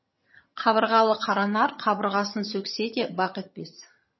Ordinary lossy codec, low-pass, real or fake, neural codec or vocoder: MP3, 24 kbps; 7.2 kHz; fake; vocoder, 22.05 kHz, 80 mel bands, HiFi-GAN